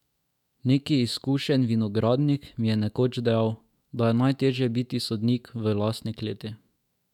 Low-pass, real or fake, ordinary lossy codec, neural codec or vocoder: 19.8 kHz; fake; none; autoencoder, 48 kHz, 128 numbers a frame, DAC-VAE, trained on Japanese speech